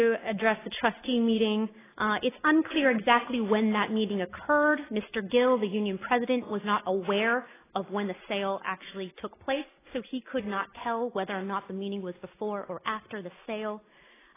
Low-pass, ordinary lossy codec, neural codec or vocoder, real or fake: 3.6 kHz; AAC, 16 kbps; none; real